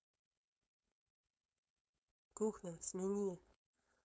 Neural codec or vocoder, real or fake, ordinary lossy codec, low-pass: codec, 16 kHz, 4.8 kbps, FACodec; fake; none; none